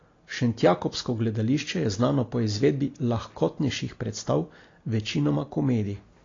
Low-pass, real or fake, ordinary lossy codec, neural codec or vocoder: 7.2 kHz; real; AAC, 32 kbps; none